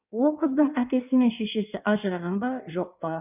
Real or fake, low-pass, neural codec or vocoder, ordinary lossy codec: fake; 3.6 kHz; codec, 16 kHz in and 24 kHz out, 1.1 kbps, FireRedTTS-2 codec; none